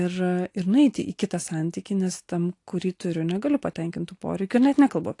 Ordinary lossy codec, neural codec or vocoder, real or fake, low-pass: AAC, 48 kbps; none; real; 10.8 kHz